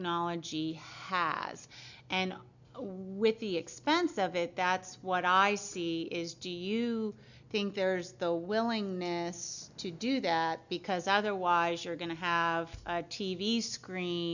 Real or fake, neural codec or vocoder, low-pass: real; none; 7.2 kHz